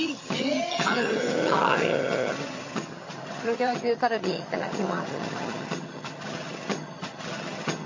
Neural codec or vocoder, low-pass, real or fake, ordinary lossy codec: vocoder, 22.05 kHz, 80 mel bands, HiFi-GAN; 7.2 kHz; fake; MP3, 32 kbps